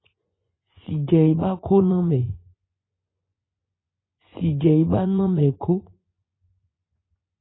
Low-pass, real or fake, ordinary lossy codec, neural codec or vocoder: 7.2 kHz; fake; AAC, 16 kbps; vocoder, 24 kHz, 100 mel bands, Vocos